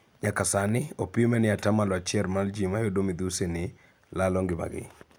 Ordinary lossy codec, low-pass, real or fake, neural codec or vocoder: none; none; real; none